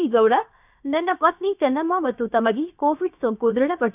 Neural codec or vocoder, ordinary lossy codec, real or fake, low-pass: codec, 16 kHz, about 1 kbps, DyCAST, with the encoder's durations; none; fake; 3.6 kHz